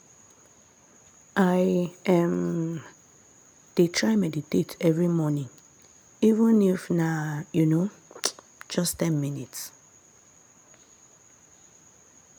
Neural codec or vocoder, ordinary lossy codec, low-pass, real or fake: none; none; none; real